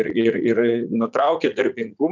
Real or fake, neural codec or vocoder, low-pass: fake; vocoder, 44.1 kHz, 80 mel bands, Vocos; 7.2 kHz